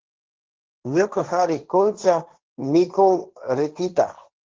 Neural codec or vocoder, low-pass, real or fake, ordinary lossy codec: codec, 16 kHz, 1.1 kbps, Voila-Tokenizer; 7.2 kHz; fake; Opus, 16 kbps